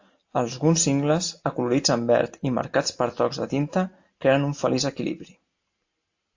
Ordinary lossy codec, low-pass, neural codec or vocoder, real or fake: AAC, 48 kbps; 7.2 kHz; none; real